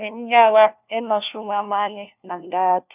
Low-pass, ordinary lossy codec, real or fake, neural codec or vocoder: 3.6 kHz; none; fake; codec, 16 kHz, 1 kbps, FunCodec, trained on LibriTTS, 50 frames a second